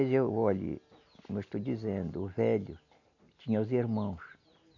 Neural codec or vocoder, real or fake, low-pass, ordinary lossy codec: none; real; 7.2 kHz; AAC, 48 kbps